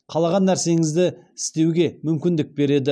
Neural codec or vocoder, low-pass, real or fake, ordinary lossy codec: none; none; real; none